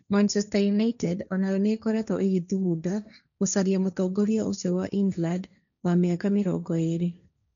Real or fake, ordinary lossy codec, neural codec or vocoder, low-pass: fake; none; codec, 16 kHz, 1.1 kbps, Voila-Tokenizer; 7.2 kHz